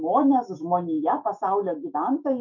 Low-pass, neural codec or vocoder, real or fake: 7.2 kHz; autoencoder, 48 kHz, 128 numbers a frame, DAC-VAE, trained on Japanese speech; fake